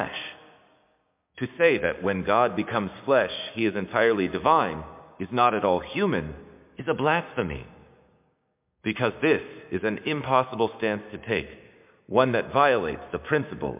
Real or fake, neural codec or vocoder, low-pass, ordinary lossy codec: fake; codec, 16 kHz, 6 kbps, DAC; 3.6 kHz; MP3, 32 kbps